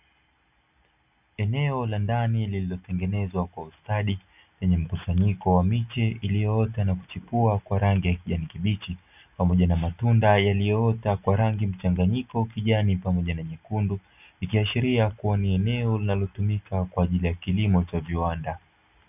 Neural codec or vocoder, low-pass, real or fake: none; 3.6 kHz; real